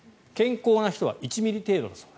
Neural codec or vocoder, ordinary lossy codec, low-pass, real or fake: none; none; none; real